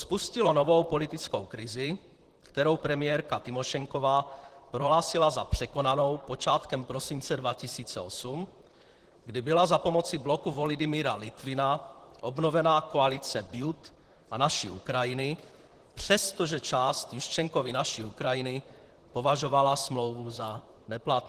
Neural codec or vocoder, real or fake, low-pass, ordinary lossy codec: vocoder, 44.1 kHz, 128 mel bands, Pupu-Vocoder; fake; 14.4 kHz; Opus, 16 kbps